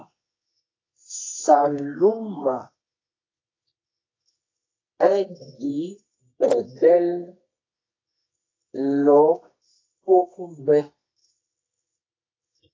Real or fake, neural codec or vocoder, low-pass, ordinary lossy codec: fake; codec, 24 kHz, 0.9 kbps, WavTokenizer, medium music audio release; 7.2 kHz; AAC, 32 kbps